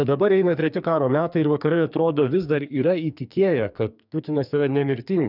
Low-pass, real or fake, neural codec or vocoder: 5.4 kHz; fake; codec, 44.1 kHz, 2.6 kbps, SNAC